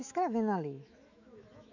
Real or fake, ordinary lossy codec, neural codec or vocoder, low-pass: fake; none; autoencoder, 48 kHz, 128 numbers a frame, DAC-VAE, trained on Japanese speech; 7.2 kHz